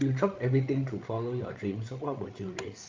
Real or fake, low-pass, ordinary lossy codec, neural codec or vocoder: fake; 7.2 kHz; Opus, 32 kbps; codec, 16 kHz, 16 kbps, FreqCodec, larger model